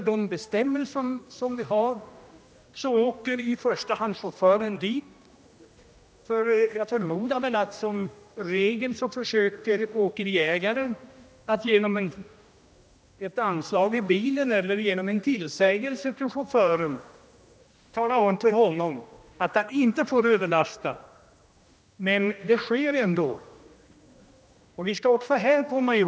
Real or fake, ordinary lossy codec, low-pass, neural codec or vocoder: fake; none; none; codec, 16 kHz, 1 kbps, X-Codec, HuBERT features, trained on general audio